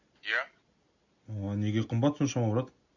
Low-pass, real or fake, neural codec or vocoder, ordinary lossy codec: 7.2 kHz; real; none; none